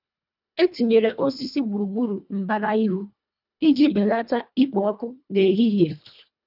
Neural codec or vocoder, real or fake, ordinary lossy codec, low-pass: codec, 24 kHz, 1.5 kbps, HILCodec; fake; none; 5.4 kHz